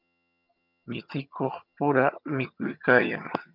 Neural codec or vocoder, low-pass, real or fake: vocoder, 22.05 kHz, 80 mel bands, HiFi-GAN; 5.4 kHz; fake